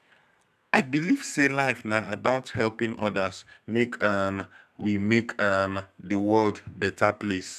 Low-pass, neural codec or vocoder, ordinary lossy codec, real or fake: 14.4 kHz; codec, 32 kHz, 1.9 kbps, SNAC; none; fake